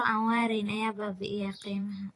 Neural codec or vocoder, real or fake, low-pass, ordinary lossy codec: vocoder, 44.1 kHz, 128 mel bands every 512 samples, BigVGAN v2; fake; 10.8 kHz; AAC, 32 kbps